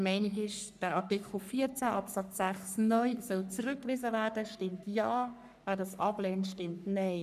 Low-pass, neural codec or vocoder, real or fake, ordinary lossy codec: 14.4 kHz; codec, 44.1 kHz, 3.4 kbps, Pupu-Codec; fake; none